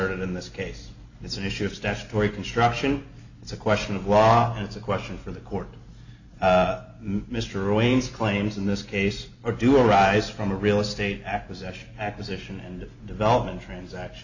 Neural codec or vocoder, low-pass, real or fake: none; 7.2 kHz; real